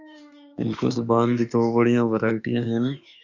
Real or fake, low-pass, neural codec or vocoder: fake; 7.2 kHz; autoencoder, 48 kHz, 32 numbers a frame, DAC-VAE, trained on Japanese speech